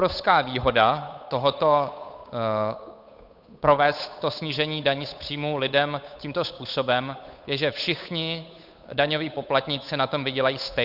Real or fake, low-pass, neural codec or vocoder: fake; 5.4 kHz; codec, 16 kHz, 8 kbps, FunCodec, trained on Chinese and English, 25 frames a second